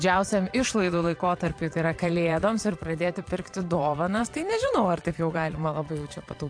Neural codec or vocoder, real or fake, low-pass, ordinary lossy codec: vocoder, 22.05 kHz, 80 mel bands, Vocos; fake; 9.9 kHz; AAC, 64 kbps